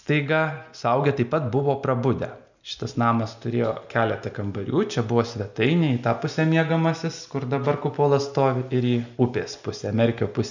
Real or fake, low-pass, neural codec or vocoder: real; 7.2 kHz; none